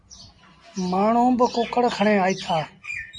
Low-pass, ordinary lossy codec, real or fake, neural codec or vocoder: 10.8 kHz; MP3, 48 kbps; real; none